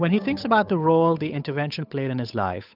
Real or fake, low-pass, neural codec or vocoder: fake; 5.4 kHz; codec, 16 kHz, 8 kbps, FunCodec, trained on Chinese and English, 25 frames a second